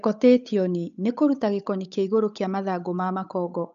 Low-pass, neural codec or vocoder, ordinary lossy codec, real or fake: 7.2 kHz; codec, 16 kHz, 8 kbps, FunCodec, trained on Chinese and English, 25 frames a second; AAC, 96 kbps; fake